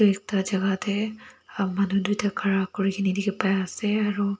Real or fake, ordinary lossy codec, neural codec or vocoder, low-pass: real; none; none; none